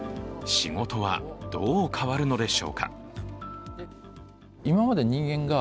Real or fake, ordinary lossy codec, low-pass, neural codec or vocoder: real; none; none; none